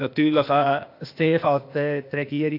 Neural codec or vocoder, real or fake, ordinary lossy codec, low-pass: codec, 16 kHz, 0.8 kbps, ZipCodec; fake; AAC, 32 kbps; 5.4 kHz